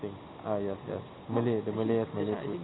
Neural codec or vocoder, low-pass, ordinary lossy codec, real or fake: none; 7.2 kHz; AAC, 16 kbps; real